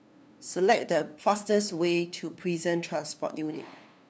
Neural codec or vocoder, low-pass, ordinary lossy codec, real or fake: codec, 16 kHz, 2 kbps, FunCodec, trained on LibriTTS, 25 frames a second; none; none; fake